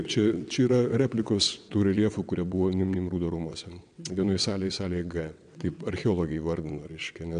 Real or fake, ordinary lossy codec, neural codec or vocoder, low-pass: fake; MP3, 96 kbps; vocoder, 22.05 kHz, 80 mel bands, WaveNeXt; 9.9 kHz